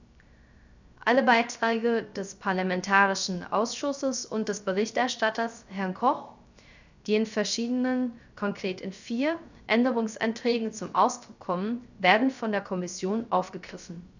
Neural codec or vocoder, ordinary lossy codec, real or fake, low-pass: codec, 16 kHz, 0.3 kbps, FocalCodec; none; fake; 7.2 kHz